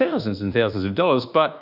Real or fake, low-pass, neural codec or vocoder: fake; 5.4 kHz; codec, 24 kHz, 1.2 kbps, DualCodec